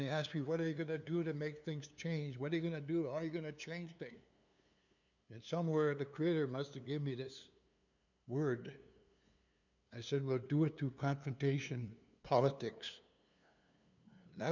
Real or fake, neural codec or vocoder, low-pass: fake; codec, 16 kHz, 2 kbps, FunCodec, trained on LibriTTS, 25 frames a second; 7.2 kHz